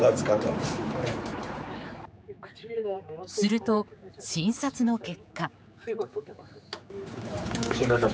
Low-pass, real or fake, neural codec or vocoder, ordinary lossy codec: none; fake; codec, 16 kHz, 4 kbps, X-Codec, HuBERT features, trained on general audio; none